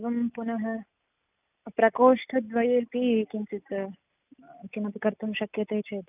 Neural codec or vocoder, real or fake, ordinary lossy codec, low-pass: none; real; none; 3.6 kHz